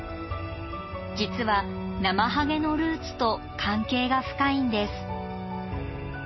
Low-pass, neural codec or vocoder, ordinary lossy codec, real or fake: 7.2 kHz; none; MP3, 24 kbps; real